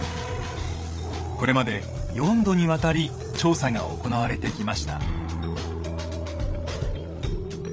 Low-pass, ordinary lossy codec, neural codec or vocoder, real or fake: none; none; codec, 16 kHz, 8 kbps, FreqCodec, larger model; fake